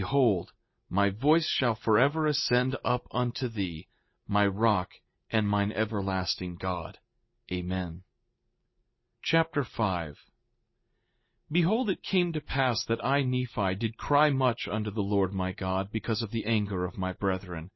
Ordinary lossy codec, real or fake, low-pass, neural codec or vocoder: MP3, 24 kbps; real; 7.2 kHz; none